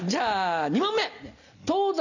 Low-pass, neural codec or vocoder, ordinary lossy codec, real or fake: 7.2 kHz; none; none; real